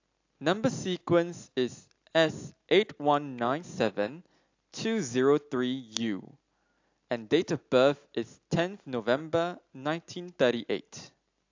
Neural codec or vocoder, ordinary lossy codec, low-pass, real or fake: none; AAC, 48 kbps; 7.2 kHz; real